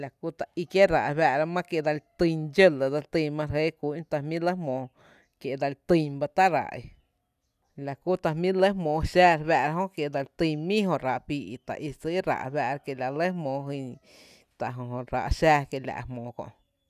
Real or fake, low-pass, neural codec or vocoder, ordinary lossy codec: real; 14.4 kHz; none; none